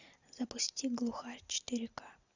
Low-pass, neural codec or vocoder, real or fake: 7.2 kHz; none; real